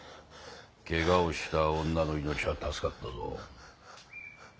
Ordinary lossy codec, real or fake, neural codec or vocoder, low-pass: none; real; none; none